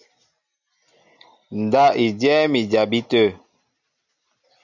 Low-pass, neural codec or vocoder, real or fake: 7.2 kHz; none; real